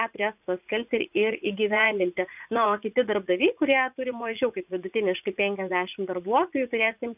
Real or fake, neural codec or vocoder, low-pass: fake; vocoder, 44.1 kHz, 80 mel bands, Vocos; 3.6 kHz